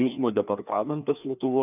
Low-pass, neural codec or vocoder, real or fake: 3.6 kHz; codec, 16 kHz, 2 kbps, FreqCodec, larger model; fake